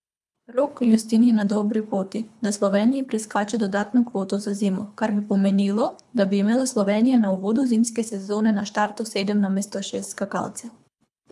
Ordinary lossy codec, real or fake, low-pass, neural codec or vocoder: none; fake; none; codec, 24 kHz, 3 kbps, HILCodec